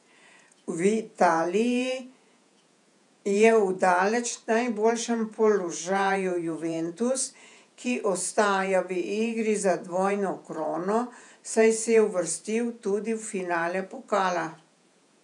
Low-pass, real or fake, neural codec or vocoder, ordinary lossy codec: 10.8 kHz; fake; vocoder, 48 kHz, 128 mel bands, Vocos; none